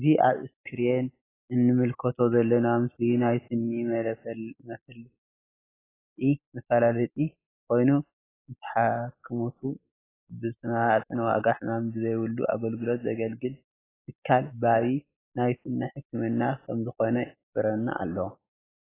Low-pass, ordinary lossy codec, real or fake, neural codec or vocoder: 3.6 kHz; AAC, 16 kbps; real; none